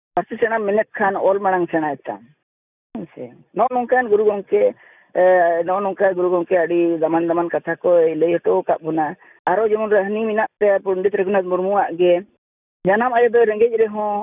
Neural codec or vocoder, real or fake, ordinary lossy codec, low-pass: none; real; none; 3.6 kHz